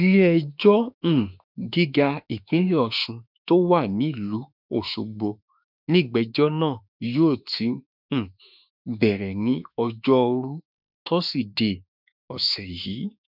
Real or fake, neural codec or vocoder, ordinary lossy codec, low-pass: fake; autoencoder, 48 kHz, 32 numbers a frame, DAC-VAE, trained on Japanese speech; none; 5.4 kHz